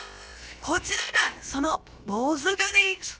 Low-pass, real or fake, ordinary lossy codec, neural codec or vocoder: none; fake; none; codec, 16 kHz, about 1 kbps, DyCAST, with the encoder's durations